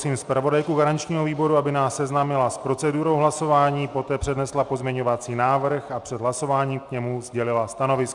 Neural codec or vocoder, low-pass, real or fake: none; 10.8 kHz; real